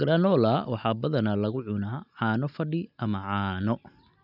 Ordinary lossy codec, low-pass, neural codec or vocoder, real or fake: none; 5.4 kHz; none; real